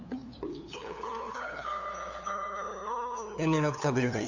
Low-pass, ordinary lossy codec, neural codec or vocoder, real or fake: 7.2 kHz; none; codec, 16 kHz, 8 kbps, FunCodec, trained on LibriTTS, 25 frames a second; fake